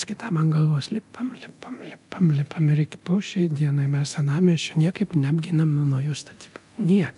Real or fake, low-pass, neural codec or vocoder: fake; 10.8 kHz; codec, 24 kHz, 0.9 kbps, DualCodec